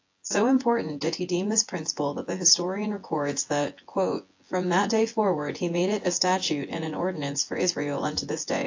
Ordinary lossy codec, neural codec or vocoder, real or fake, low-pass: AAC, 48 kbps; vocoder, 24 kHz, 100 mel bands, Vocos; fake; 7.2 kHz